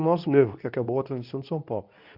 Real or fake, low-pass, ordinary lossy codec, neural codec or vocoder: fake; 5.4 kHz; none; codec, 24 kHz, 0.9 kbps, WavTokenizer, medium speech release version 2